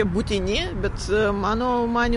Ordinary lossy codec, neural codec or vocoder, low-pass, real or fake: MP3, 48 kbps; none; 14.4 kHz; real